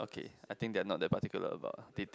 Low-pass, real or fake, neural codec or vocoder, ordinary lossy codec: none; real; none; none